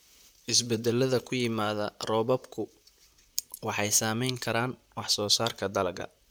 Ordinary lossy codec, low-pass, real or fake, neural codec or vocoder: none; none; fake; vocoder, 44.1 kHz, 128 mel bands, Pupu-Vocoder